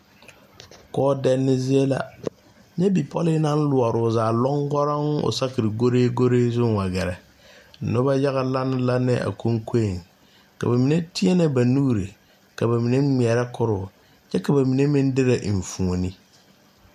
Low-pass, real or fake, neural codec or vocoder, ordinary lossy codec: 14.4 kHz; real; none; MP3, 96 kbps